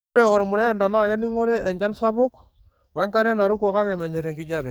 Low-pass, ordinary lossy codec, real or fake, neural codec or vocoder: none; none; fake; codec, 44.1 kHz, 2.6 kbps, SNAC